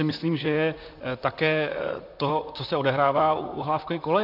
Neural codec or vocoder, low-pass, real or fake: vocoder, 44.1 kHz, 128 mel bands, Pupu-Vocoder; 5.4 kHz; fake